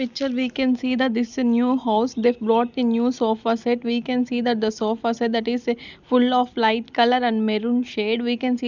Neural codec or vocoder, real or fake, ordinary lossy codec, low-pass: none; real; none; 7.2 kHz